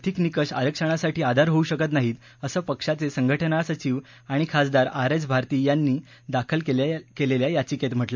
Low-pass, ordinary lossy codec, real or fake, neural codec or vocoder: 7.2 kHz; MP3, 64 kbps; real; none